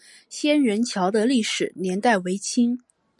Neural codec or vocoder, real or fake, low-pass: none; real; 10.8 kHz